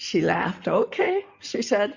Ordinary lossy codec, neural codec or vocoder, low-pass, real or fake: Opus, 64 kbps; codec, 16 kHz in and 24 kHz out, 2.2 kbps, FireRedTTS-2 codec; 7.2 kHz; fake